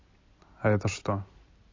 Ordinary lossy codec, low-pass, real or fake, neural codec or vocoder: AAC, 32 kbps; 7.2 kHz; real; none